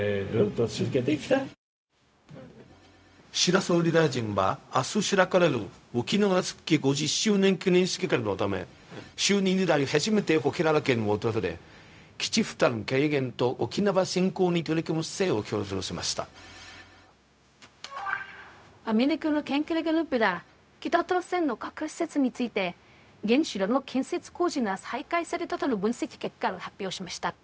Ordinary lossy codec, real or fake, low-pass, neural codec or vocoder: none; fake; none; codec, 16 kHz, 0.4 kbps, LongCat-Audio-Codec